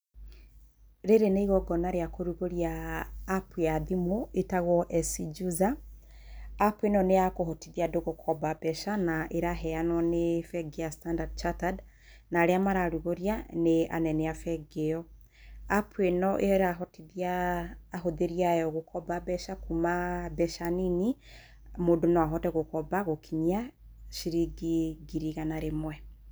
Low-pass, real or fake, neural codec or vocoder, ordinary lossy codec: none; real; none; none